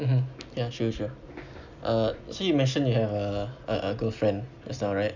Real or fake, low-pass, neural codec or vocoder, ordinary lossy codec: real; 7.2 kHz; none; none